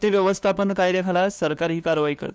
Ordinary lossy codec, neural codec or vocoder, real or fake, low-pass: none; codec, 16 kHz, 2 kbps, FunCodec, trained on LibriTTS, 25 frames a second; fake; none